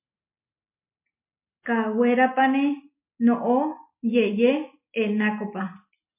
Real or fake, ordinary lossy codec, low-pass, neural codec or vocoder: real; MP3, 24 kbps; 3.6 kHz; none